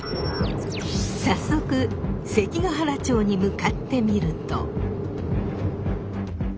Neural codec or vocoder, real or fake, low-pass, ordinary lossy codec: none; real; none; none